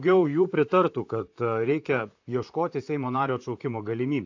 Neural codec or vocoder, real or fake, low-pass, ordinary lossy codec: vocoder, 44.1 kHz, 128 mel bands, Pupu-Vocoder; fake; 7.2 kHz; AAC, 48 kbps